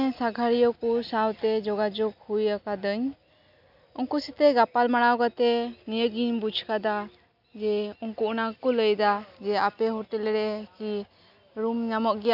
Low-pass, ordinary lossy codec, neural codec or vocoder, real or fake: 5.4 kHz; none; none; real